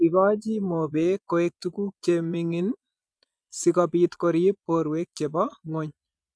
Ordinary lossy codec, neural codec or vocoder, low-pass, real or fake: none; vocoder, 22.05 kHz, 80 mel bands, Vocos; none; fake